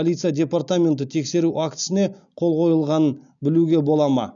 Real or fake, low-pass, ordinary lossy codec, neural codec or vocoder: real; 7.2 kHz; none; none